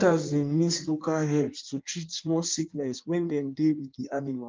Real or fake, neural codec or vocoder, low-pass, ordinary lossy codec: fake; codec, 16 kHz in and 24 kHz out, 1.1 kbps, FireRedTTS-2 codec; 7.2 kHz; Opus, 32 kbps